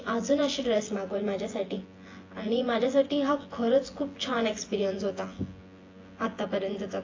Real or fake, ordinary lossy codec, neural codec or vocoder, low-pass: fake; AAC, 32 kbps; vocoder, 24 kHz, 100 mel bands, Vocos; 7.2 kHz